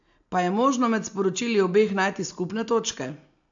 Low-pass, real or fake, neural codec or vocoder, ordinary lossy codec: 7.2 kHz; real; none; AAC, 64 kbps